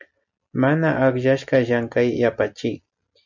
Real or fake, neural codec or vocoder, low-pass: real; none; 7.2 kHz